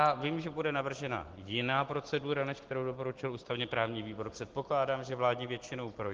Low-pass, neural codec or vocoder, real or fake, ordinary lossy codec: 7.2 kHz; none; real; Opus, 16 kbps